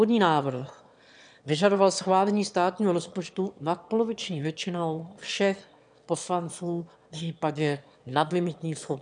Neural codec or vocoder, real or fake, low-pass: autoencoder, 22.05 kHz, a latent of 192 numbers a frame, VITS, trained on one speaker; fake; 9.9 kHz